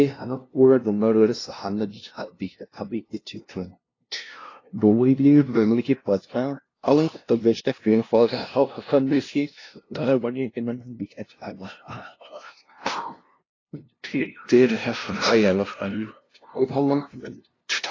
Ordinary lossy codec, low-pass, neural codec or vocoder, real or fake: AAC, 32 kbps; 7.2 kHz; codec, 16 kHz, 0.5 kbps, FunCodec, trained on LibriTTS, 25 frames a second; fake